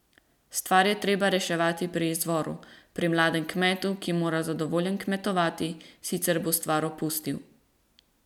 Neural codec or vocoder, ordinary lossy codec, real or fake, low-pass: none; none; real; 19.8 kHz